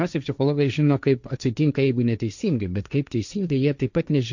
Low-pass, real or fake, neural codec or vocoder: 7.2 kHz; fake; codec, 16 kHz, 1.1 kbps, Voila-Tokenizer